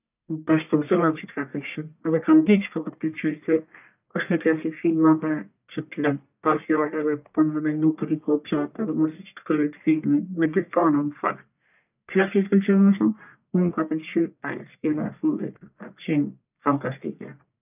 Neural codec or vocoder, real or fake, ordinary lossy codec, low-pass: codec, 44.1 kHz, 1.7 kbps, Pupu-Codec; fake; none; 3.6 kHz